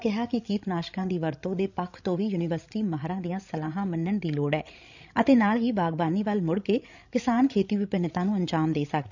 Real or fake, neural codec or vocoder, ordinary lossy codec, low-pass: fake; codec, 16 kHz, 16 kbps, FreqCodec, larger model; none; 7.2 kHz